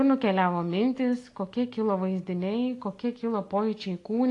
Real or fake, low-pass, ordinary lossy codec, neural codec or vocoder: real; 10.8 kHz; AAC, 48 kbps; none